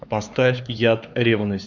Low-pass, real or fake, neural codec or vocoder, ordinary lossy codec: 7.2 kHz; fake; codec, 16 kHz, 4 kbps, X-Codec, HuBERT features, trained on LibriSpeech; Opus, 64 kbps